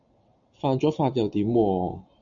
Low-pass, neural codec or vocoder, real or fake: 7.2 kHz; none; real